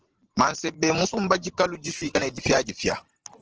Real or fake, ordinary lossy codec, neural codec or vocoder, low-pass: fake; Opus, 16 kbps; vocoder, 44.1 kHz, 128 mel bands every 512 samples, BigVGAN v2; 7.2 kHz